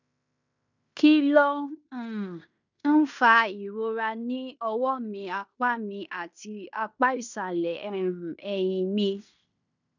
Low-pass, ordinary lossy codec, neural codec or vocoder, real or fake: 7.2 kHz; none; codec, 16 kHz in and 24 kHz out, 0.9 kbps, LongCat-Audio-Codec, fine tuned four codebook decoder; fake